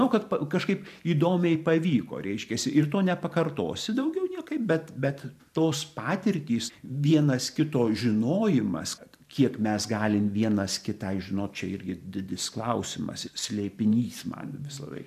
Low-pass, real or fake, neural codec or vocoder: 14.4 kHz; fake; vocoder, 44.1 kHz, 128 mel bands every 512 samples, BigVGAN v2